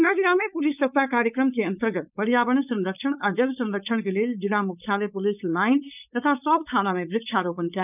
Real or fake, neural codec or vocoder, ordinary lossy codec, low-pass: fake; codec, 16 kHz, 4.8 kbps, FACodec; none; 3.6 kHz